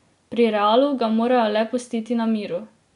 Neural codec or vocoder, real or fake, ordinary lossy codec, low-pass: none; real; none; 10.8 kHz